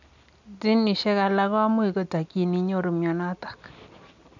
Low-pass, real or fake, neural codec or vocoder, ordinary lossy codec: 7.2 kHz; real; none; none